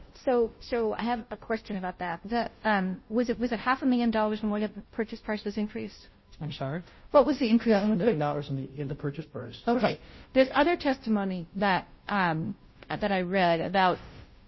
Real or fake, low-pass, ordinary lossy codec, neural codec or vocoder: fake; 7.2 kHz; MP3, 24 kbps; codec, 16 kHz, 0.5 kbps, FunCodec, trained on Chinese and English, 25 frames a second